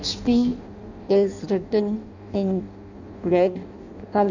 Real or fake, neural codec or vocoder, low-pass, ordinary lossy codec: fake; codec, 16 kHz in and 24 kHz out, 0.6 kbps, FireRedTTS-2 codec; 7.2 kHz; none